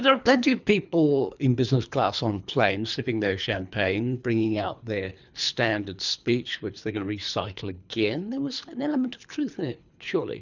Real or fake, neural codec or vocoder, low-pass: fake; codec, 24 kHz, 3 kbps, HILCodec; 7.2 kHz